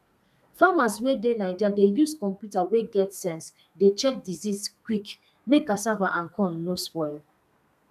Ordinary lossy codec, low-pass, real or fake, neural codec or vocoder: none; 14.4 kHz; fake; codec, 32 kHz, 1.9 kbps, SNAC